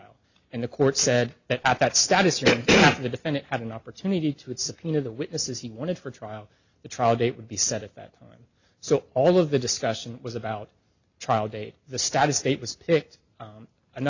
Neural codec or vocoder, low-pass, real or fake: none; 7.2 kHz; real